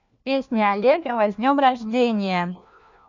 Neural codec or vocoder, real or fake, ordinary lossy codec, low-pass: codec, 16 kHz, 1 kbps, FunCodec, trained on LibriTTS, 50 frames a second; fake; none; 7.2 kHz